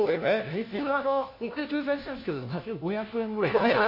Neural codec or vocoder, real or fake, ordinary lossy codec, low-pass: codec, 16 kHz, 1 kbps, FunCodec, trained on LibriTTS, 50 frames a second; fake; MP3, 32 kbps; 5.4 kHz